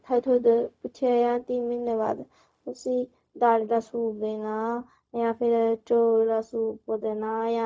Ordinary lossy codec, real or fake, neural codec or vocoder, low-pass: none; fake; codec, 16 kHz, 0.4 kbps, LongCat-Audio-Codec; none